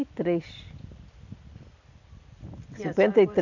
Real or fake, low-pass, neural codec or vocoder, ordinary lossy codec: real; 7.2 kHz; none; none